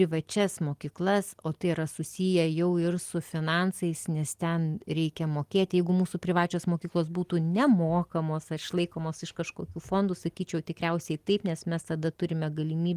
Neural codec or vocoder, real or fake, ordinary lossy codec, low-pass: none; real; Opus, 24 kbps; 14.4 kHz